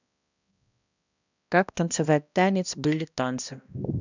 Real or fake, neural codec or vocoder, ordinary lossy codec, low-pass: fake; codec, 16 kHz, 1 kbps, X-Codec, HuBERT features, trained on balanced general audio; none; 7.2 kHz